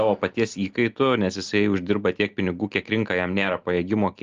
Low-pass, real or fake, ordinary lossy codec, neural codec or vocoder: 7.2 kHz; real; Opus, 32 kbps; none